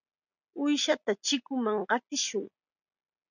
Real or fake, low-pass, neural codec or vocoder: real; 7.2 kHz; none